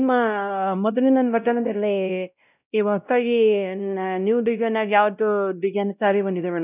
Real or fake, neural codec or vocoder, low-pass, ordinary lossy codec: fake; codec, 16 kHz, 0.5 kbps, X-Codec, WavLM features, trained on Multilingual LibriSpeech; 3.6 kHz; none